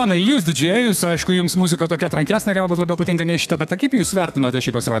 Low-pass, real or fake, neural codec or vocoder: 14.4 kHz; fake; codec, 32 kHz, 1.9 kbps, SNAC